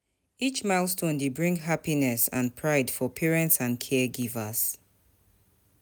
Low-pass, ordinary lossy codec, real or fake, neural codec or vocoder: none; none; real; none